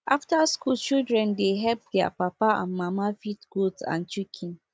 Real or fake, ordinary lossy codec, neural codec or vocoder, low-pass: real; none; none; none